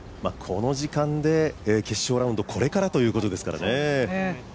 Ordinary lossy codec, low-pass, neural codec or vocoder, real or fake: none; none; none; real